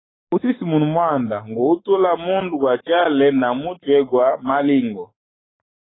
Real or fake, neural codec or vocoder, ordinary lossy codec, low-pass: fake; codec, 44.1 kHz, 7.8 kbps, Pupu-Codec; AAC, 16 kbps; 7.2 kHz